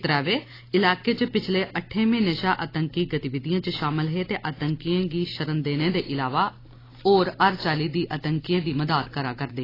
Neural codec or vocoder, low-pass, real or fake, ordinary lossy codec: none; 5.4 kHz; real; AAC, 24 kbps